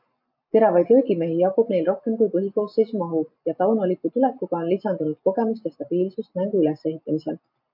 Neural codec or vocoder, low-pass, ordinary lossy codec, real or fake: none; 5.4 kHz; MP3, 48 kbps; real